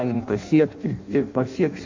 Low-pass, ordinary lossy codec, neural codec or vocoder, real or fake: 7.2 kHz; MP3, 48 kbps; codec, 16 kHz in and 24 kHz out, 0.6 kbps, FireRedTTS-2 codec; fake